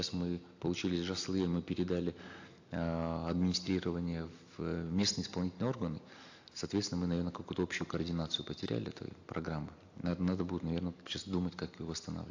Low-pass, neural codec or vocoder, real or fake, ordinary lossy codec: 7.2 kHz; none; real; AAC, 48 kbps